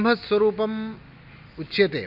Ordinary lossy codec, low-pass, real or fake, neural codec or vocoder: none; 5.4 kHz; real; none